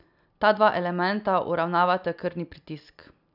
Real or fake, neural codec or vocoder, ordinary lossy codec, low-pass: real; none; none; 5.4 kHz